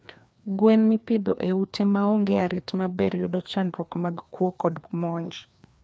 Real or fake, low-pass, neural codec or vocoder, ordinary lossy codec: fake; none; codec, 16 kHz, 2 kbps, FreqCodec, larger model; none